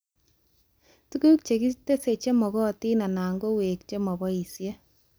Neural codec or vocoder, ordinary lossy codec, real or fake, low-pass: none; none; real; none